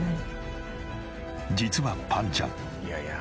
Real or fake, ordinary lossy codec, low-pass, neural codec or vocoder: real; none; none; none